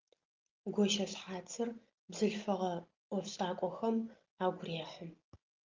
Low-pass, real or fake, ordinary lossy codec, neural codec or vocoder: 7.2 kHz; real; Opus, 24 kbps; none